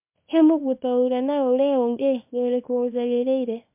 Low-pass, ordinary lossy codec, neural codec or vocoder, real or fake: 3.6 kHz; MP3, 32 kbps; codec, 24 kHz, 0.9 kbps, WavTokenizer, small release; fake